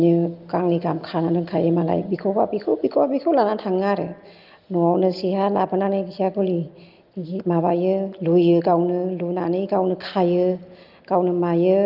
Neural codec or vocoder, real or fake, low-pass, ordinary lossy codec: none; real; 5.4 kHz; Opus, 32 kbps